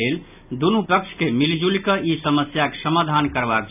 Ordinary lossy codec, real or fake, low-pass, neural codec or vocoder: none; real; 3.6 kHz; none